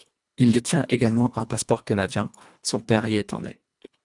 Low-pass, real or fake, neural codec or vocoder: 10.8 kHz; fake; codec, 24 kHz, 1.5 kbps, HILCodec